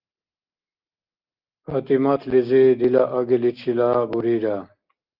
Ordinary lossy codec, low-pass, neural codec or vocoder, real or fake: Opus, 32 kbps; 5.4 kHz; none; real